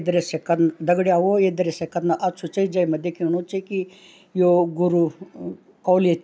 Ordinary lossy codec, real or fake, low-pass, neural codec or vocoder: none; real; none; none